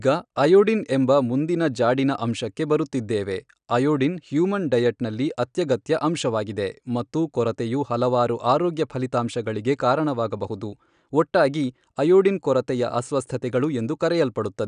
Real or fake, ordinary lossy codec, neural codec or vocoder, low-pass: real; none; none; 9.9 kHz